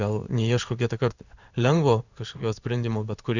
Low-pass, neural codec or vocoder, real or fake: 7.2 kHz; codec, 16 kHz in and 24 kHz out, 1 kbps, XY-Tokenizer; fake